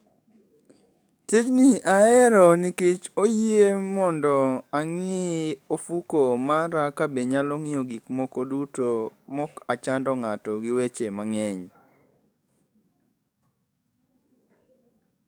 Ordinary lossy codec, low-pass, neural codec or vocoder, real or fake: none; none; codec, 44.1 kHz, 7.8 kbps, DAC; fake